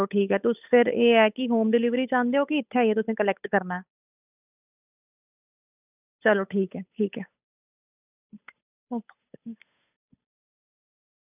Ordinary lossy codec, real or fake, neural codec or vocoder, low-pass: none; fake; codec, 16 kHz, 8 kbps, FunCodec, trained on Chinese and English, 25 frames a second; 3.6 kHz